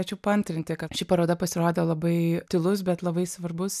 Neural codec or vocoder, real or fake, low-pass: none; real; 14.4 kHz